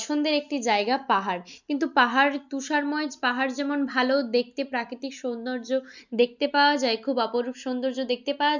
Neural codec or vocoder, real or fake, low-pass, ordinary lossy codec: none; real; 7.2 kHz; none